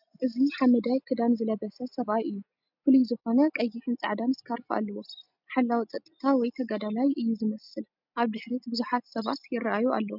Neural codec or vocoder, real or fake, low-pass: none; real; 5.4 kHz